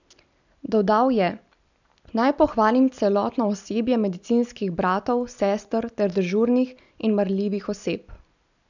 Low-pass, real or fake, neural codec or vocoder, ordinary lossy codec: 7.2 kHz; real; none; none